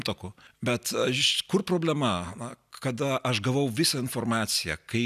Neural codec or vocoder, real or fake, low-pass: none; real; 14.4 kHz